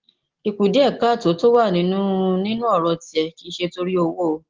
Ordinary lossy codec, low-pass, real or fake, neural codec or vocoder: Opus, 16 kbps; 7.2 kHz; real; none